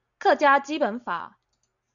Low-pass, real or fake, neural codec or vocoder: 7.2 kHz; real; none